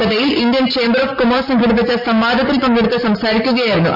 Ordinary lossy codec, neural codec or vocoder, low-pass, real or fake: none; none; 5.4 kHz; real